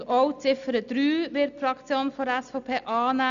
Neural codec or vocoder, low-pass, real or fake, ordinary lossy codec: none; 7.2 kHz; real; none